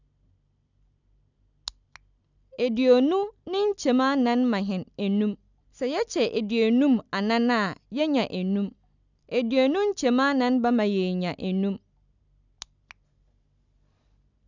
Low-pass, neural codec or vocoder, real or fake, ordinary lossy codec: 7.2 kHz; none; real; none